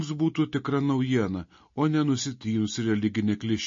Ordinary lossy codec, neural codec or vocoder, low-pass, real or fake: MP3, 32 kbps; none; 7.2 kHz; real